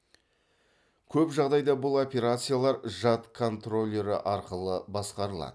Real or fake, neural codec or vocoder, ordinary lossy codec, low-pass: real; none; none; none